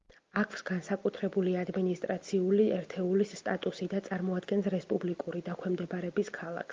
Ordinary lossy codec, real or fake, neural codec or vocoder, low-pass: Opus, 32 kbps; real; none; 7.2 kHz